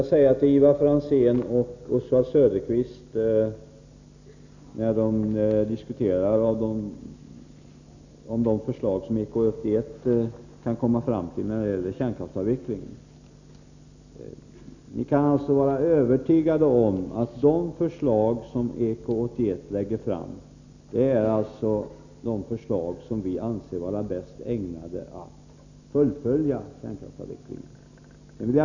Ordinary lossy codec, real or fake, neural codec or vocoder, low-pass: none; real; none; 7.2 kHz